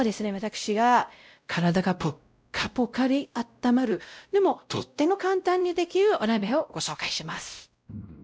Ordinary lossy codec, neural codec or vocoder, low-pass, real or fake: none; codec, 16 kHz, 0.5 kbps, X-Codec, WavLM features, trained on Multilingual LibriSpeech; none; fake